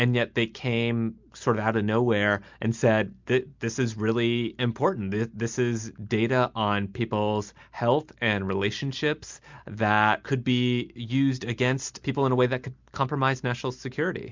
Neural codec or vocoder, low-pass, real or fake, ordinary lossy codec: none; 7.2 kHz; real; MP3, 64 kbps